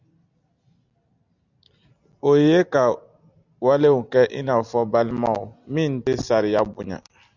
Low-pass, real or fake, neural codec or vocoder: 7.2 kHz; real; none